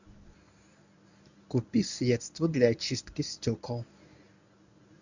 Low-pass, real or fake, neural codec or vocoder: 7.2 kHz; fake; codec, 24 kHz, 0.9 kbps, WavTokenizer, medium speech release version 1